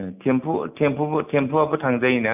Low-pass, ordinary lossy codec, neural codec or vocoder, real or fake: 3.6 kHz; none; none; real